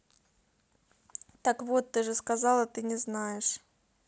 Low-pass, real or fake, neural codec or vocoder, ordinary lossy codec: none; real; none; none